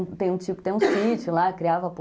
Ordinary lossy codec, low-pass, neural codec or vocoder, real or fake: none; none; none; real